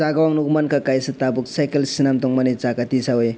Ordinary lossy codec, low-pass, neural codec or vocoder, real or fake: none; none; none; real